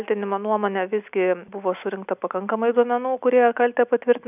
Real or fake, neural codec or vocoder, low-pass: real; none; 3.6 kHz